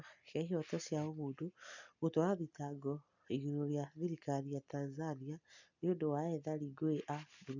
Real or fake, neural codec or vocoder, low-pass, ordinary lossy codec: real; none; 7.2 kHz; none